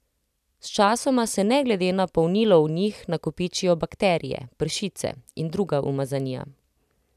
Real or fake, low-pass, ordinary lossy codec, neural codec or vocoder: real; 14.4 kHz; none; none